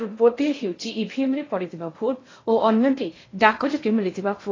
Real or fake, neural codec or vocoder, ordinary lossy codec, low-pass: fake; codec, 16 kHz in and 24 kHz out, 0.6 kbps, FocalCodec, streaming, 2048 codes; AAC, 32 kbps; 7.2 kHz